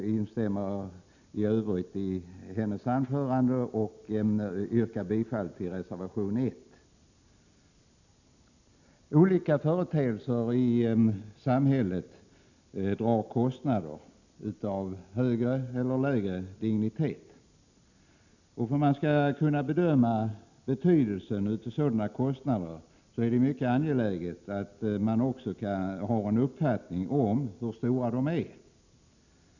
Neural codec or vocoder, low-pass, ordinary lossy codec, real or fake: none; 7.2 kHz; none; real